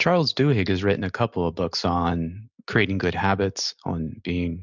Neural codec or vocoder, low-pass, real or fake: none; 7.2 kHz; real